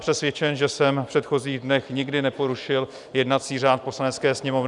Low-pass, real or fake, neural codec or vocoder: 10.8 kHz; real; none